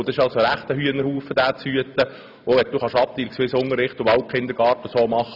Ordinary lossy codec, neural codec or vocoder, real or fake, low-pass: none; none; real; 5.4 kHz